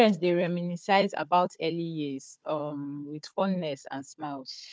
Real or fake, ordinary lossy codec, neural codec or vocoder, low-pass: fake; none; codec, 16 kHz, 4 kbps, FunCodec, trained on Chinese and English, 50 frames a second; none